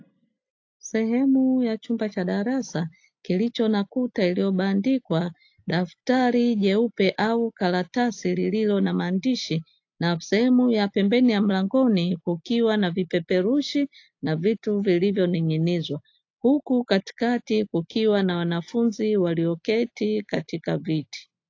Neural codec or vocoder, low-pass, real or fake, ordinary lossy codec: none; 7.2 kHz; real; AAC, 48 kbps